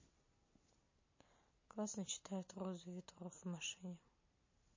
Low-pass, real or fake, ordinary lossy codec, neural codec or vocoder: 7.2 kHz; real; MP3, 32 kbps; none